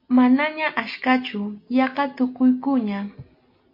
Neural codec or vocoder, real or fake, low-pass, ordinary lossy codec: none; real; 5.4 kHz; MP3, 32 kbps